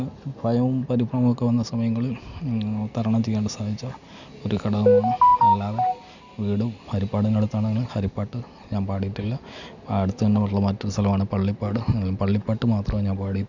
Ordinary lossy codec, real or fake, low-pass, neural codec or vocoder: none; real; 7.2 kHz; none